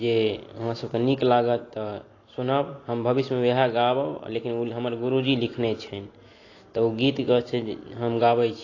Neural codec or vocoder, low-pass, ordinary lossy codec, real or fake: none; 7.2 kHz; AAC, 32 kbps; real